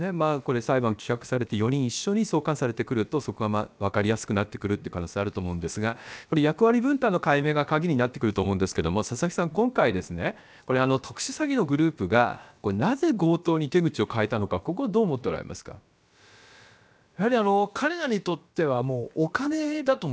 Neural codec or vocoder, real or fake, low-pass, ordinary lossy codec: codec, 16 kHz, about 1 kbps, DyCAST, with the encoder's durations; fake; none; none